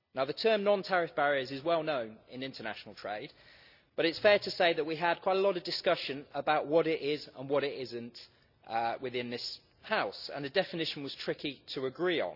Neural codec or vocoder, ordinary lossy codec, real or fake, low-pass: none; none; real; 5.4 kHz